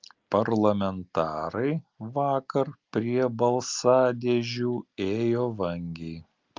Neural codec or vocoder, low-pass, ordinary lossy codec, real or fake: none; 7.2 kHz; Opus, 32 kbps; real